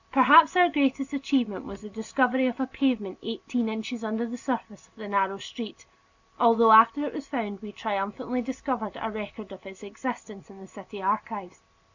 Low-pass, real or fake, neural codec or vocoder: 7.2 kHz; real; none